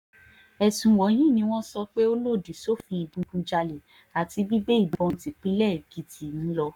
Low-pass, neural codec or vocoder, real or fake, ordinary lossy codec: 19.8 kHz; codec, 44.1 kHz, 7.8 kbps, Pupu-Codec; fake; none